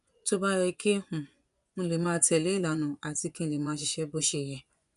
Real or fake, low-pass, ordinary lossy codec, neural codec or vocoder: fake; 10.8 kHz; none; vocoder, 24 kHz, 100 mel bands, Vocos